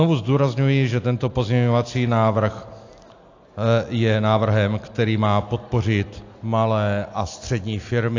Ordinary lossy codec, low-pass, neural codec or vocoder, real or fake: AAC, 48 kbps; 7.2 kHz; none; real